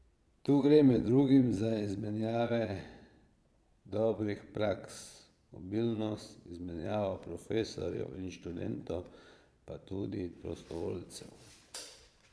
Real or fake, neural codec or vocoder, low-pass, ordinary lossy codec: fake; vocoder, 22.05 kHz, 80 mel bands, Vocos; none; none